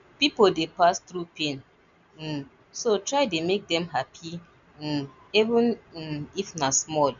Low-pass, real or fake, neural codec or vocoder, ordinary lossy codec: 7.2 kHz; real; none; none